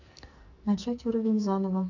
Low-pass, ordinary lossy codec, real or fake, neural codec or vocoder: 7.2 kHz; none; fake; codec, 44.1 kHz, 2.6 kbps, SNAC